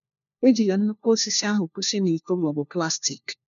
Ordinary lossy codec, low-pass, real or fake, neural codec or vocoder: none; 7.2 kHz; fake; codec, 16 kHz, 1 kbps, FunCodec, trained on LibriTTS, 50 frames a second